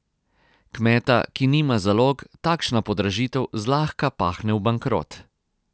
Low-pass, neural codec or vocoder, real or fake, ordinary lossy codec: none; none; real; none